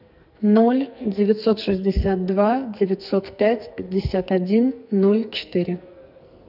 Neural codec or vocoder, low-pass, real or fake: codec, 44.1 kHz, 2.6 kbps, SNAC; 5.4 kHz; fake